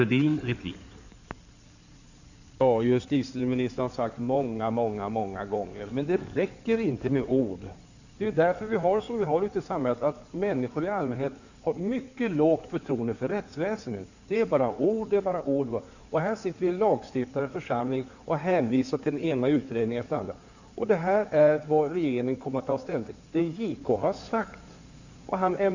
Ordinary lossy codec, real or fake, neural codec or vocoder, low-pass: none; fake; codec, 16 kHz in and 24 kHz out, 2.2 kbps, FireRedTTS-2 codec; 7.2 kHz